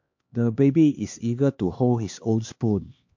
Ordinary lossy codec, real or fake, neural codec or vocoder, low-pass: MP3, 48 kbps; fake; codec, 16 kHz, 2 kbps, X-Codec, HuBERT features, trained on LibriSpeech; 7.2 kHz